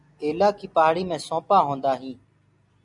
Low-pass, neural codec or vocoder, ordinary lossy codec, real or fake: 10.8 kHz; none; MP3, 96 kbps; real